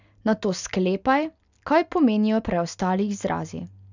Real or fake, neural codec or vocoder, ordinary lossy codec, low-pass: real; none; none; 7.2 kHz